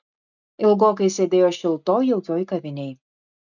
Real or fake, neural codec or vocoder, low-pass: real; none; 7.2 kHz